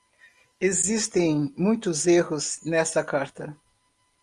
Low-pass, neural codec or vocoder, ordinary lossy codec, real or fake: 10.8 kHz; none; Opus, 32 kbps; real